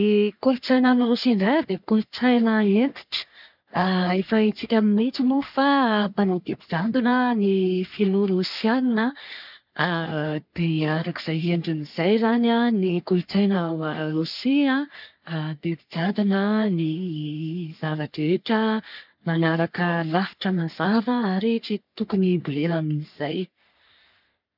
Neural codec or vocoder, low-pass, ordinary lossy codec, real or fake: codec, 44.1 kHz, 3.4 kbps, Pupu-Codec; 5.4 kHz; none; fake